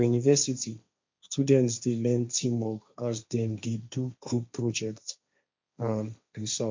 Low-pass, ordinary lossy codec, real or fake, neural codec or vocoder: 7.2 kHz; MP3, 64 kbps; fake; codec, 16 kHz, 1.1 kbps, Voila-Tokenizer